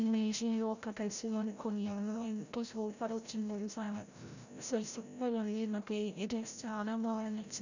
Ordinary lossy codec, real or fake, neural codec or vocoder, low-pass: none; fake; codec, 16 kHz, 0.5 kbps, FreqCodec, larger model; 7.2 kHz